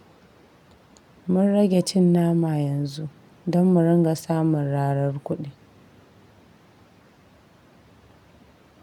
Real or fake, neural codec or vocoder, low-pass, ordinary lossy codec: real; none; 19.8 kHz; none